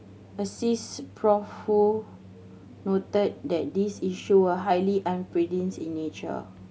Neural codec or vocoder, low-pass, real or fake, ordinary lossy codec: none; none; real; none